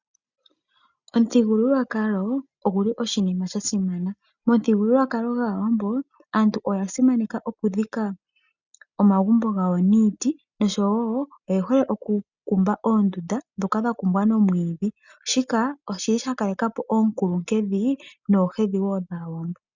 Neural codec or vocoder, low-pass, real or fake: none; 7.2 kHz; real